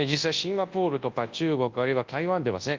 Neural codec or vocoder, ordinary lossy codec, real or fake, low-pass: codec, 24 kHz, 0.9 kbps, WavTokenizer, large speech release; Opus, 32 kbps; fake; 7.2 kHz